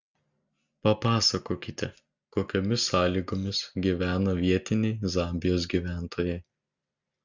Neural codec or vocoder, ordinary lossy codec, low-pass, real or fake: none; Opus, 64 kbps; 7.2 kHz; real